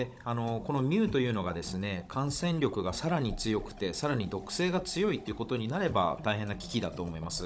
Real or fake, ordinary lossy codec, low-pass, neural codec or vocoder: fake; none; none; codec, 16 kHz, 16 kbps, FunCodec, trained on Chinese and English, 50 frames a second